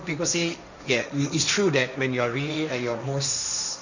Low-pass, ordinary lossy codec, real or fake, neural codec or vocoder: 7.2 kHz; none; fake; codec, 16 kHz, 1.1 kbps, Voila-Tokenizer